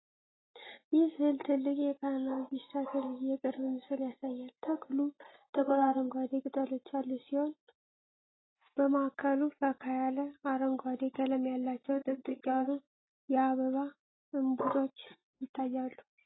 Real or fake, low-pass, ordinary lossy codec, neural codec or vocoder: real; 7.2 kHz; AAC, 16 kbps; none